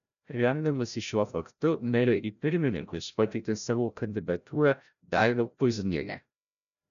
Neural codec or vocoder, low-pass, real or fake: codec, 16 kHz, 0.5 kbps, FreqCodec, larger model; 7.2 kHz; fake